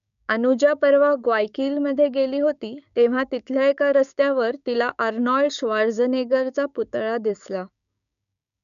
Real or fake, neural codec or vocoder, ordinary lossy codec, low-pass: fake; codec, 16 kHz, 6 kbps, DAC; none; 7.2 kHz